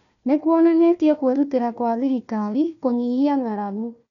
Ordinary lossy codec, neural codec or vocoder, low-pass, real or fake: none; codec, 16 kHz, 1 kbps, FunCodec, trained on Chinese and English, 50 frames a second; 7.2 kHz; fake